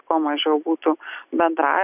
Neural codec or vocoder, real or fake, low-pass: none; real; 3.6 kHz